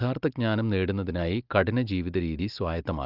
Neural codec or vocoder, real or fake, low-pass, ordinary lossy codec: none; real; 5.4 kHz; Opus, 32 kbps